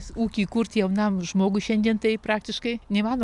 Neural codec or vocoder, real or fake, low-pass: none; real; 10.8 kHz